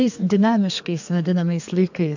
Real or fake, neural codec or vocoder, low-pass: fake; codec, 32 kHz, 1.9 kbps, SNAC; 7.2 kHz